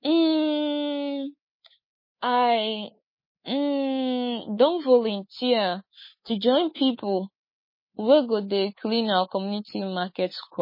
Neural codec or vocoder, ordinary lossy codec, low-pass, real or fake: codec, 24 kHz, 3.1 kbps, DualCodec; MP3, 24 kbps; 5.4 kHz; fake